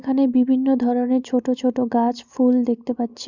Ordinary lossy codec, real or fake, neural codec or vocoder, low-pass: Opus, 64 kbps; real; none; 7.2 kHz